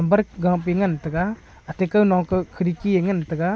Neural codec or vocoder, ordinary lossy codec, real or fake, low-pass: none; none; real; none